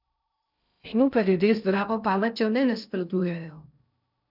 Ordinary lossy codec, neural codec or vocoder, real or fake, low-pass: none; codec, 16 kHz in and 24 kHz out, 0.6 kbps, FocalCodec, streaming, 2048 codes; fake; 5.4 kHz